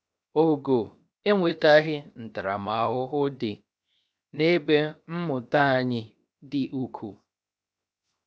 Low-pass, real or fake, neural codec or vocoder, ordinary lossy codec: none; fake; codec, 16 kHz, 0.7 kbps, FocalCodec; none